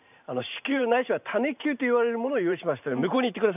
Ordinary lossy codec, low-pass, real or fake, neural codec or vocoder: none; 3.6 kHz; fake; vocoder, 44.1 kHz, 128 mel bands every 512 samples, BigVGAN v2